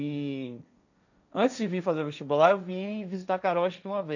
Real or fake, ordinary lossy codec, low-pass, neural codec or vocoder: fake; none; 7.2 kHz; codec, 16 kHz, 1.1 kbps, Voila-Tokenizer